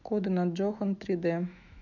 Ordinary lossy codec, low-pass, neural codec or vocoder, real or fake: none; 7.2 kHz; autoencoder, 48 kHz, 128 numbers a frame, DAC-VAE, trained on Japanese speech; fake